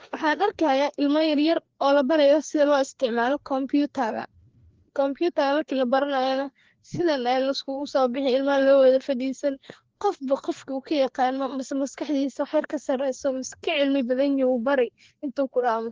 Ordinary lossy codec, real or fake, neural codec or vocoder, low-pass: Opus, 16 kbps; fake; codec, 16 kHz, 2 kbps, FreqCodec, larger model; 7.2 kHz